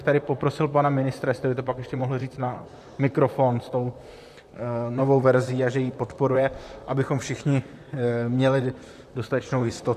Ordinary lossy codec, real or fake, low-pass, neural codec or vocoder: AAC, 96 kbps; fake; 14.4 kHz; vocoder, 44.1 kHz, 128 mel bands, Pupu-Vocoder